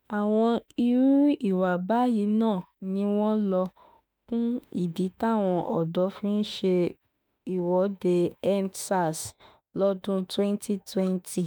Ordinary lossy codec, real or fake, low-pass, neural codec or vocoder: none; fake; none; autoencoder, 48 kHz, 32 numbers a frame, DAC-VAE, trained on Japanese speech